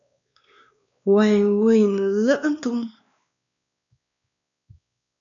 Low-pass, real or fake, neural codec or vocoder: 7.2 kHz; fake; codec, 16 kHz, 2 kbps, X-Codec, WavLM features, trained on Multilingual LibriSpeech